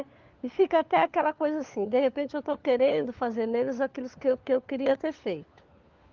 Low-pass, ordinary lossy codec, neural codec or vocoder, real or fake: 7.2 kHz; Opus, 32 kbps; codec, 16 kHz, 4 kbps, FunCodec, trained on LibriTTS, 50 frames a second; fake